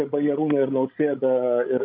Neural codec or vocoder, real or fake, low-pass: codec, 16 kHz, 16 kbps, FreqCodec, larger model; fake; 5.4 kHz